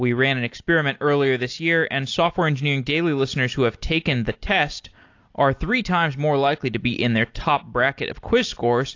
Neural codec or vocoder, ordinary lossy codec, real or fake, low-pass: none; AAC, 48 kbps; real; 7.2 kHz